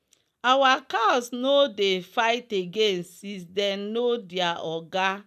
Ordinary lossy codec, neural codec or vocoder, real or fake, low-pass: none; none; real; 14.4 kHz